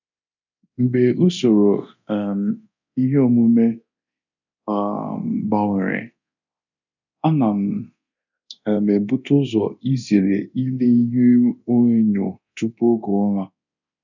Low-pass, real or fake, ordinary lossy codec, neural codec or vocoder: 7.2 kHz; fake; none; codec, 24 kHz, 0.9 kbps, DualCodec